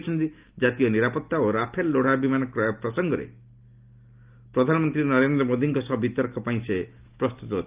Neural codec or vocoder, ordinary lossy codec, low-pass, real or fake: none; Opus, 24 kbps; 3.6 kHz; real